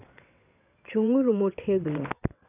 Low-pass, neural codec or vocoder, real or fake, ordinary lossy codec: 3.6 kHz; vocoder, 22.05 kHz, 80 mel bands, WaveNeXt; fake; none